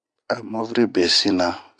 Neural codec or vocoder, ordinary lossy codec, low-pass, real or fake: none; AAC, 64 kbps; 9.9 kHz; real